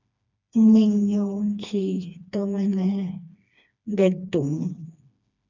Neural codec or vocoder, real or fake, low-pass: codec, 16 kHz, 2 kbps, FreqCodec, smaller model; fake; 7.2 kHz